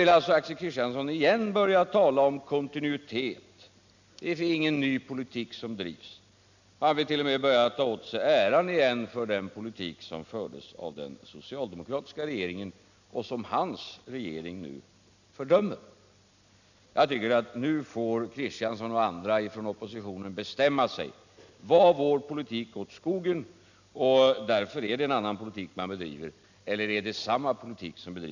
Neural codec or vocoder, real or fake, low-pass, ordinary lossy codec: none; real; 7.2 kHz; none